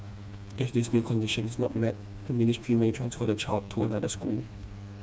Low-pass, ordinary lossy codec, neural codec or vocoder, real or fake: none; none; codec, 16 kHz, 2 kbps, FreqCodec, smaller model; fake